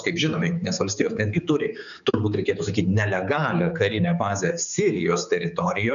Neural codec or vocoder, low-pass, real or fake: codec, 16 kHz, 4 kbps, X-Codec, HuBERT features, trained on general audio; 7.2 kHz; fake